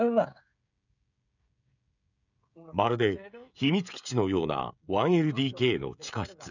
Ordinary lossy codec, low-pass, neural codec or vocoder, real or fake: none; 7.2 kHz; codec, 16 kHz, 16 kbps, FreqCodec, smaller model; fake